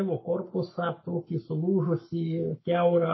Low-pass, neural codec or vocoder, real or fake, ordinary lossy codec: 7.2 kHz; codec, 44.1 kHz, 7.8 kbps, Pupu-Codec; fake; MP3, 24 kbps